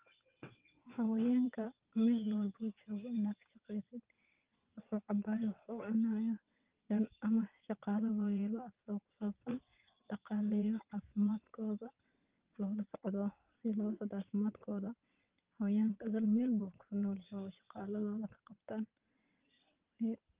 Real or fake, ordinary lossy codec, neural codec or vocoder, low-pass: fake; Opus, 32 kbps; vocoder, 22.05 kHz, 80 mel bands, WaveNeXt; 3.6 kHz